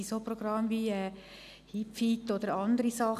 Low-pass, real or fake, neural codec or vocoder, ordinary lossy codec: 14.4 kHz; real; none; none